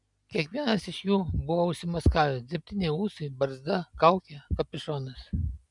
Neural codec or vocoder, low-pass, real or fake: none; 10.8 kHz; real